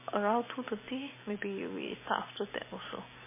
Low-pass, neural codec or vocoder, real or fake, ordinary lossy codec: 3.6 kHz; none; real; MP3, 16 kbps